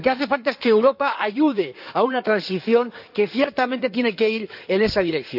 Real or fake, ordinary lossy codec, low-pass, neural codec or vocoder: fake; none; 5.4 kHz; codec, 16 kHz in and 24 kHz out, 2.2 kbps, FireRedTTS-2 codec